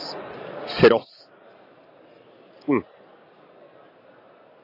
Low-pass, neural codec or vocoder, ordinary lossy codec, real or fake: 5.4 kHz; vocoder, 44.1 kHz, 128 mel bands every 256 samples, BigVGAN v2; AAC, 32 kbps; fake